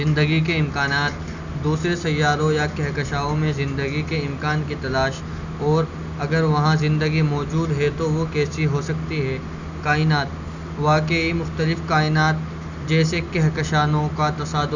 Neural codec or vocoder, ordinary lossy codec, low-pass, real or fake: none; none; 7.2 kHz; real